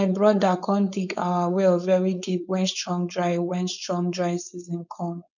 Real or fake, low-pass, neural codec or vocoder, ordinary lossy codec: fake; 7.2 kHz; codec, 16 kHz, 4.8 kbps, FACodec; none